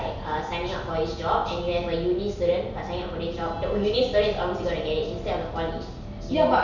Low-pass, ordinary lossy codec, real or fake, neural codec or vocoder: 7.2 kHz; none; real; none